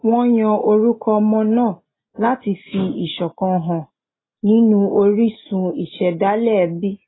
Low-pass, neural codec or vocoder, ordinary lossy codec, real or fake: 7.2 kHz; none; AAC, 16 kbps; real